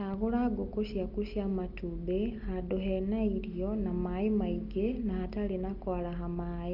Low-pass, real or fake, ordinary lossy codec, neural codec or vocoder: 5.4 kHz; real; Opus, 32 kbps; none